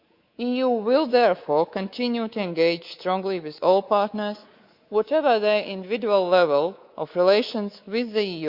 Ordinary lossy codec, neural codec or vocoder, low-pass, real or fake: Opus, 64 kbps; codec, 24 kHz, 3.1 kbps, DualCodec; 5.4 kHz; fake